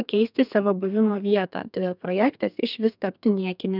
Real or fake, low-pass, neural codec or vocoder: fake; 5.4 kHz; codec, 32 kHz, 1.9 kbps, SNAC